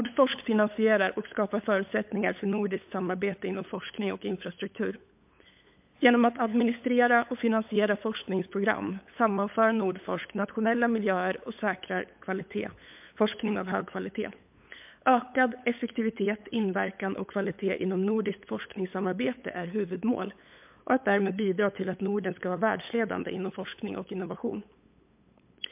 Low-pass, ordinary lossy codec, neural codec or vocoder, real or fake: 3.6 kHz; MP3, 32 kbps; codec, 16 kHz, 8 kbps, FunCodec, trained on LibriTTS, 25 frames a second; fake